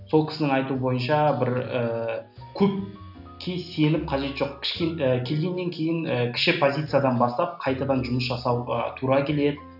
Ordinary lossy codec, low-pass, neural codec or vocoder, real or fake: none; 5.4 kHz; none; real